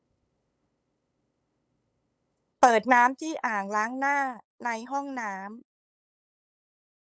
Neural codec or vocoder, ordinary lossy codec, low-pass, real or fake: codec, 16 kHz, 8 kbps, FunCodec, trained on LibriTTS, 25 frames a second; none; none; fake